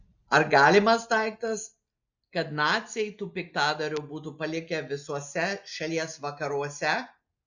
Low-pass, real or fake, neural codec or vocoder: 7.2 kHz; real; none